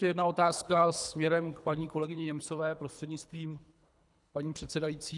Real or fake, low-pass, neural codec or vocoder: fake; 10.8 kHz; codec, 24 kHz, 3 kbps, HILCodec